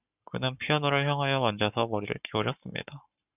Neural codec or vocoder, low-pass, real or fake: codec, 44.1 kHz, 7.8 kbps, Pupu-Codec; 3.6 kHz; fake